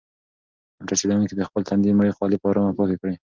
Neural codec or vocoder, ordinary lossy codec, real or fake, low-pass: none; Opus, 24 kbps; real; 7.2 kHz